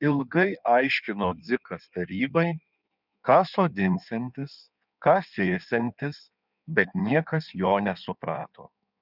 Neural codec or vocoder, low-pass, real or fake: codec, 16 kHz in and 24 kHz out, 1.1 kbps, FireRedTTS-2 codec; 5.4 kHz; fake